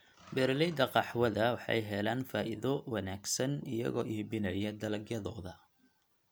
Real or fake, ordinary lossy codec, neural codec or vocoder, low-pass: real; none; none; none